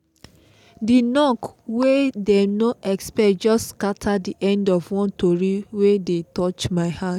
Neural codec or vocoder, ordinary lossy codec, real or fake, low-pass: none; none; real; 19.8 kHz